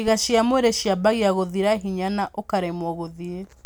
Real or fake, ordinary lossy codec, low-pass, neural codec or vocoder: real; none; none; none